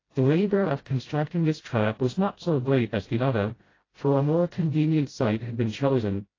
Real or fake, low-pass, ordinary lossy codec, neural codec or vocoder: fake; 7.2 kHz; AAC, 32 kbps; codec, 16 kHz, 0.5 kbps, FreqCodec, smaller model